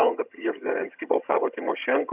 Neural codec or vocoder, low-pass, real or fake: vocoder, 22.05 kHz, 80 mel bands, HiFi-GAN; 3.6 kHz; fake